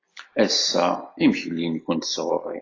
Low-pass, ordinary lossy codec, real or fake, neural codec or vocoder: 7.2 kHz; AAC, 32 kbps; real; none